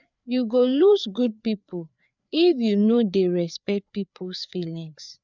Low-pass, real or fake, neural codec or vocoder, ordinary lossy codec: 7.2 kHz; fake; codec, 16 kHz, 4 kbps, FreqCodec, larger model; none